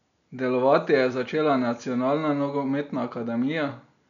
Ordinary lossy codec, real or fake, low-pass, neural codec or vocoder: none; real; 7.2 kHz; none